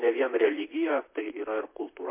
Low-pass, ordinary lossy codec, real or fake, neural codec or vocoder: 3.6 kHz; MP3, 24 kbps; fake; vocoder, 44.1 kHz, 128 mel bands, Pupu-Vocoder